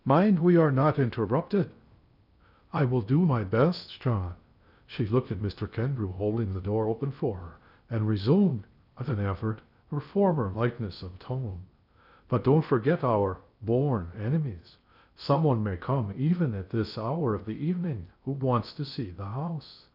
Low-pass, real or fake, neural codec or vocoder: 5.4 kHz; fake; codec, 16 kHz in and 24 kHz out, 0.6 kbps, FocalCodec, streaming, 2048 codes